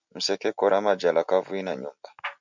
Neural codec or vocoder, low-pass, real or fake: none; 7.2 kHz; real